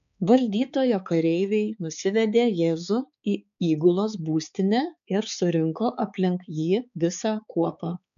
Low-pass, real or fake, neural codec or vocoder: 7.2 kHz; fake; codec, 16 kHz, 4 kbps, X-Codec, HuBERT features, trained on balanced general audio